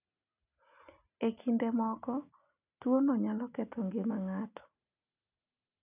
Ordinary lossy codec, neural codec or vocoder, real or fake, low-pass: none; none; real; 3.6 kHz